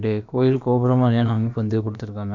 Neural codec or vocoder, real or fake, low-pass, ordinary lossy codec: codec, 16 kHz, about 1 kbps, DyCAST, with the encoder's durations; fake; 7.2 kHz; none